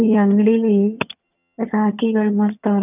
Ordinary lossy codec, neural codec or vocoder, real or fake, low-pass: none; vocoder, 22.05 kHz, 80 mel bands, HiFi-GAN; fake; 3.6 kHz